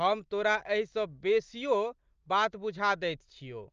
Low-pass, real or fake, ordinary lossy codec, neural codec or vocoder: 7.2 kHz; real; Opus, 24 kbps; none